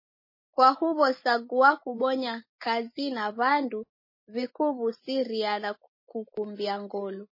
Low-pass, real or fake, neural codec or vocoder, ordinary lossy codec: 5.4 kHz; real; none; MP3, 24 kbps